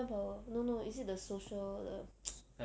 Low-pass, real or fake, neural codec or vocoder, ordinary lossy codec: none; real; none; none